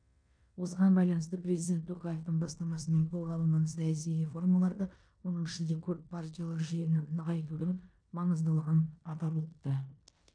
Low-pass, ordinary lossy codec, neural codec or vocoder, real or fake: 9.9 kHz; none; codec, 16 kHz in and 24 kHz out, 0.9 kbps, LongCat-Audio-Codec, four codebook decoder; fake